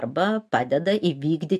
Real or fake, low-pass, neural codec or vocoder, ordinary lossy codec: real; 14.4 kHz; none; MP3, 64 kbps